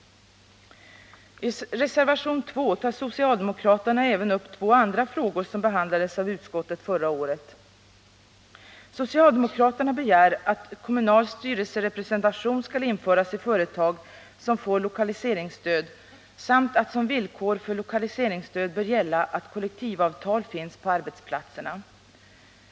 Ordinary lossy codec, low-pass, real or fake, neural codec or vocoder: none; none; real; none